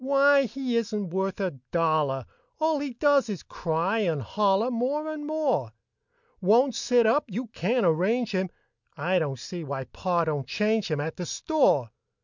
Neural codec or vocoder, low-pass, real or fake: none; 7.2 kHz; real